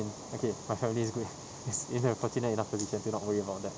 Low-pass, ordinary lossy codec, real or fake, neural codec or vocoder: none; none; real; none